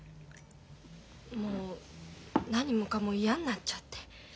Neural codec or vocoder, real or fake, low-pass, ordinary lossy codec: none; real; none; none